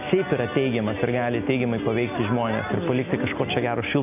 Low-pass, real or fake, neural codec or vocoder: 3.6 kHz; real; none